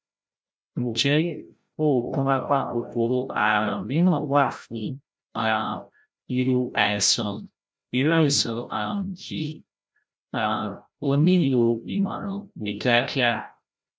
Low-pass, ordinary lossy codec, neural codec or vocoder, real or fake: none; none; codec, 16 kHz, 0.5 kbps, FreqCodec, larger model; fake